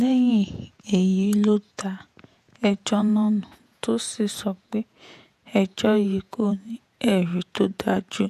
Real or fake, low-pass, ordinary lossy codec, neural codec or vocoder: fake; 14.4 kHz; none; vocoder, 48 kHz, 128 mel bands, Vocos